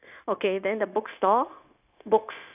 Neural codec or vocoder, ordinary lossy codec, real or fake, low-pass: codec, 16 kHz, 0.9 kbps, LongCat-Audio-Codec; none; fake; 3.6 kHz